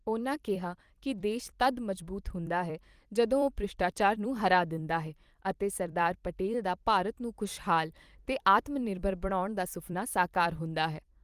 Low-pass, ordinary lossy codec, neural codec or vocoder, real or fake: 14.4 kHz; Opus, 24 kbps; vocoder, 44.1 kHz, 128 mel bands every 256 samples, BigVGAN v2; fake